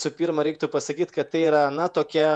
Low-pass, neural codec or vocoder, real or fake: 10.8 kHz; vocoder, 48 kHz, 128 mel bands, Vocos; fake